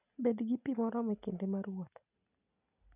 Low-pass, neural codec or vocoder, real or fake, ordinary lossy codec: 3.6 kHz; none; real; none